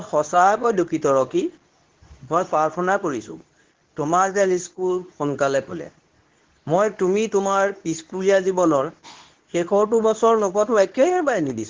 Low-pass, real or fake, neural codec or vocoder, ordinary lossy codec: 7.2 kHz; fake; codec, 24 kHz, 0.9 kbps, WavTokenizer, medium speech release version 2; Opus, 16 kbps